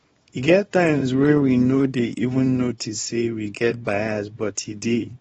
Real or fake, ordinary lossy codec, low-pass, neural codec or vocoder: fake; AAC, 24 kbps; 10.8 kHz; codec, 24 kHz, 0.9 kbps, WavTokenizer, small release